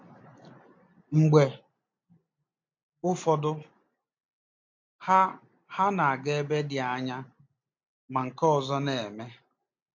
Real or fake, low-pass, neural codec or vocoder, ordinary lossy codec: real; 7.2 kHz; none; MP3, 48 kbps